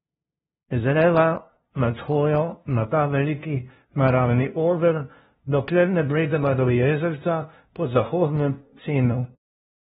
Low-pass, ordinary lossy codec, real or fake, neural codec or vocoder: 7.2 kHz; AAC, 16 kbps; fake; codec, 16 kHz, 0.5 kbps, FunCodec, trained on LibriTTS, 25 frames a second